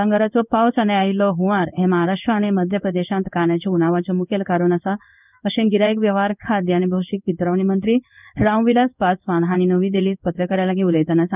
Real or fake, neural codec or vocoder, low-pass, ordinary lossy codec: fake; codec, 16 kHz in and 24 kHz out, 1 kbps, XY-Tokenizer; 3.6 kHz; none